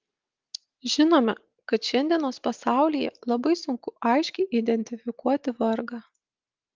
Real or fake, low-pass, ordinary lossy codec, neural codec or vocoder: real; 7.2 kHz; Opus, 32 kbps; none